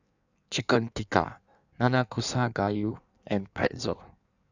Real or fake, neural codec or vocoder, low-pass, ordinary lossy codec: fake; codec, 16 kHz in and 24 kHz out, 1.1 kbps, FireRedTTS-2 codec; 7.2 kHz; none